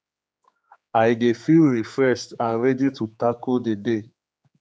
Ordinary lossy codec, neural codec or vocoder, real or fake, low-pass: none; codec, 16 kHz, 4 kbps, X-Codec, HuBERT features, trained on general audio; fake; none